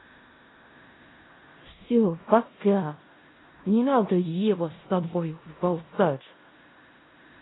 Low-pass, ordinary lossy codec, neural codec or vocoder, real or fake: 7.2 kHz; AAC, 16 kbps; codec, 16 kHz in and 24 kHz out, 0.4 kbps, LongCat-Audio-Codec, four codebook decoder; fake